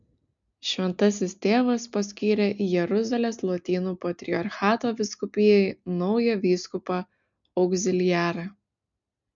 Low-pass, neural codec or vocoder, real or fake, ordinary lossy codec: 7.2 kHz; none; real; MP3, 64 kbps